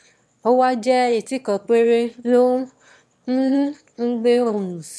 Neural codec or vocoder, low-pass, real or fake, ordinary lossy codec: autoencoder, 22.05 kHz, a latent of 192 numbers a frame, VITS, trained on one speaker; none; fake; none